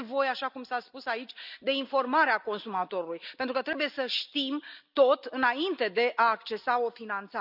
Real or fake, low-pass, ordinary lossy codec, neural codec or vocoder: real; 5.4 kHz; none; none